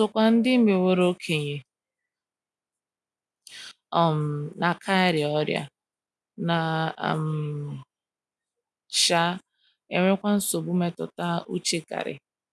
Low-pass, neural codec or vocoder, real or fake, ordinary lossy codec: none; none; real; none